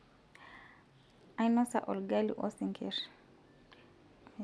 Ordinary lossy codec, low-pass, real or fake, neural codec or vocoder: none; none; real; none